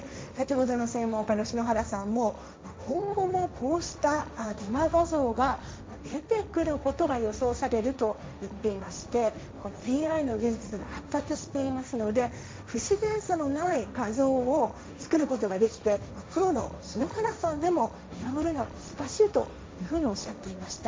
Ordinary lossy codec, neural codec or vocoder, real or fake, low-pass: none; codec, 16 kHz, 1.1 kbps, Voila-Tokenizer; fake; none